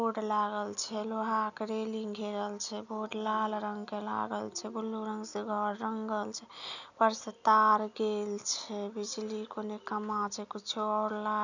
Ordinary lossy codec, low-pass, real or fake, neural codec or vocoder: none; 7.2 kHz; real; none